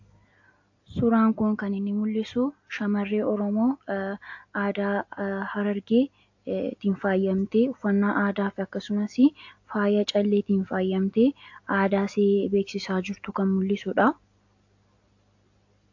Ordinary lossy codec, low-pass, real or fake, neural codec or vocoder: AAC, 48 kbps; 7.2 kHz; real; none